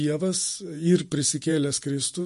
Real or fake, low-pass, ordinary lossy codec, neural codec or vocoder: fake; 14.4 kHz; MP3, 48 kbps; vocoder, 48 kHz, 128 mel bands, Vocos